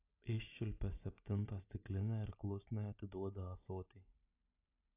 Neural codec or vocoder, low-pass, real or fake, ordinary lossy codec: none; 3.6 kHz; real; AAC, 32 kbps